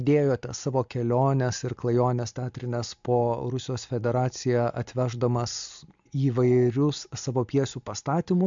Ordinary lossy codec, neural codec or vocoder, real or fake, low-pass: MP3, 64 kbps; none; real; 7.2 kHz